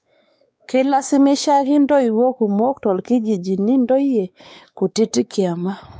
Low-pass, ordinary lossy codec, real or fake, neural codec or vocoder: none; none; fake; codec, 16 kHz, 4 kbps, X-Codec, WavLM features, trained on Multilingual LibriSpeech